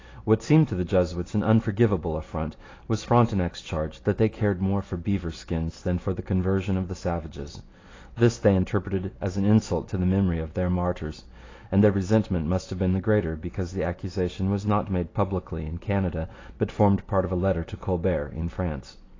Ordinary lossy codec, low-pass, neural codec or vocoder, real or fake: AAC, 32 kbps; 7.2 kHz; none; real